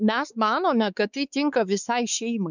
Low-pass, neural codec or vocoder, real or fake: 7.2 kHz; codec, 16 kHz, 4 kbps, X-Codec, WavLM features, trained on Multilingual LibriSpeech; fake